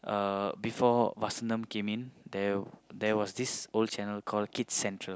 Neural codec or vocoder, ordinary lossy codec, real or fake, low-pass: none; none; real; none